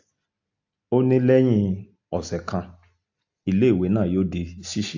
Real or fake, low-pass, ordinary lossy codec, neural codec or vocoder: real; 7.2 kHz; AAC, 48 kbps; none